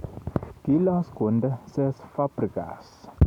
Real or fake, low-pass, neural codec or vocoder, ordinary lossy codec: real; 19.8 kHz; none; none